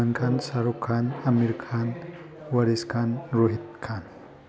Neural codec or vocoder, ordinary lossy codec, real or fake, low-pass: none; none; real; none